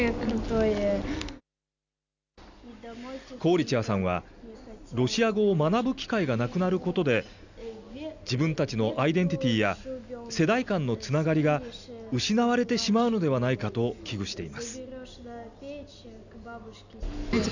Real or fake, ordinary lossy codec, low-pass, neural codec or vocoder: real; none; 7.2 kHz; none